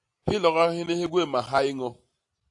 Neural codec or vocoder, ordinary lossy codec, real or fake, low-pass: none; AAC, 64 kbps; real; 10.8 kHz